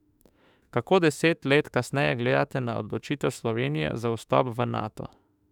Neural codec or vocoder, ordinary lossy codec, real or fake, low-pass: autoencoder, 48 kHz, 32 numbers a frame, DAC-VAE, trained on Japanese speech; none; fake; 19.8 kHz